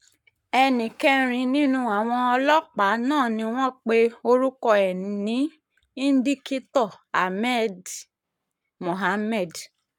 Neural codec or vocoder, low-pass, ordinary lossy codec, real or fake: codec, 44.1 kHz, 7.8 kbps, Pupu-Codec; 19.8 kHz; none; fake